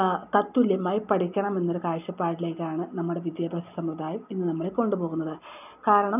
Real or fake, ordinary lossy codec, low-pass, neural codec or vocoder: real; none; 3.6 kHz; none